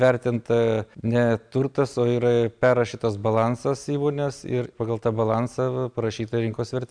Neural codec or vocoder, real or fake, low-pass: none; real; 9.9 kHz